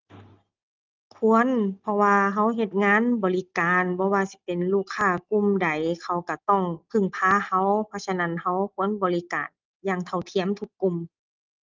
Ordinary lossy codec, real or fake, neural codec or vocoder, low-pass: Opus, 24 kbps; real; none; 7.2 kHz